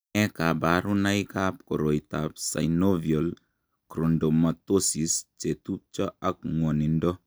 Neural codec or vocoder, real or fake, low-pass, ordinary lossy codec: none; real; none; none